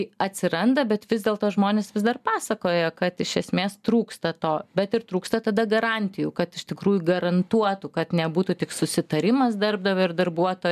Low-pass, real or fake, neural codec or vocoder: 14.4 kHz; real; none